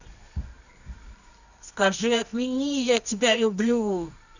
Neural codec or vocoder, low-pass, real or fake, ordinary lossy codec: codec, 24 kHz, 0.9 kbps, WavTokenizer, medium music audio release; 7.2 kHz; fake; none